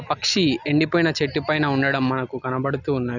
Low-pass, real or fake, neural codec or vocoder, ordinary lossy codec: 7.2 kHz; real; none; none